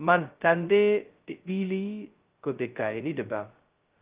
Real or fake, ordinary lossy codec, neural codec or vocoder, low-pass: fake; Opus, 32 kbps; codec, 16 kHz, 0.2 kbps, FocalCodec; 3.6 kHz